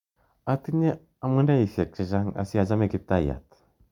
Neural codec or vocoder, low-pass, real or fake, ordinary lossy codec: none; 19.8 kHz; real; MP3, 96 kbps